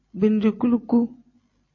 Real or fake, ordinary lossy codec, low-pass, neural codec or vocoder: real; MP3, 32 kbps; 7.2 kHz; none